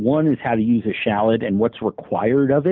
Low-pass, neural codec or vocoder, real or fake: 7.2 kHz; none; real